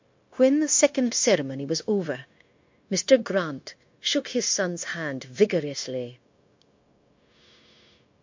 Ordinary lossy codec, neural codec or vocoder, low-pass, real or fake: MP3, 48 kbps; codec, 16 kHz, 0.9 kbps, LongCat-Audio-Codec; 7.2 kHz; fake